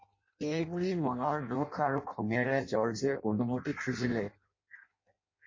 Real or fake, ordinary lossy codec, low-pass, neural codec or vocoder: fake; MP3, 32 kbps; 7.2 kHz; codec, 16 kHz in and 24 kHz out, 0.6 kbps, FireRedTTS-2 codec